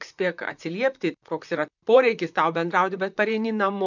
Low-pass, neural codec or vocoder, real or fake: 7.2 kHz; vocoder, 44.1 kHz, 128 mel bands, Pupu-Vocoder; fake